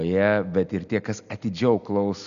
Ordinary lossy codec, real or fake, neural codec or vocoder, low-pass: MP3, 96 kbps; real; none; 7.2 kHz